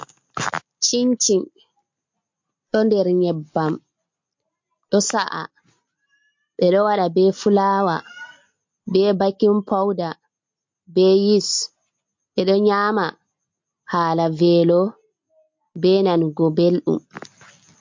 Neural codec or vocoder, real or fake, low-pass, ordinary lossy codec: none; real; 7.2 kHz; MP3, 48 kbps